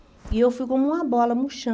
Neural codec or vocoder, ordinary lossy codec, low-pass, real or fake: none; none; none; real